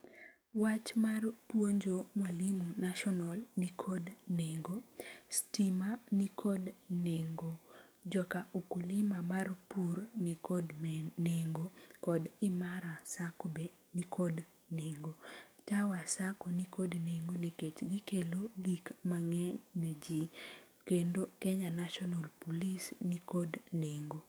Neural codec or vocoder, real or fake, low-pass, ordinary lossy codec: codec, 44.1 kHz, 7.8 kbps, DAC; fake; none; none